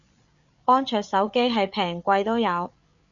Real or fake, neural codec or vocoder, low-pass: fake; codec, 16 kHz, 8 kbps, FreqCodec, larger model; 7.2 kHz